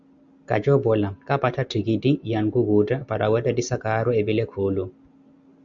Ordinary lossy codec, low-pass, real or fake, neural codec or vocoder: Opus, 64 kbps; 7.2 kHz; real; none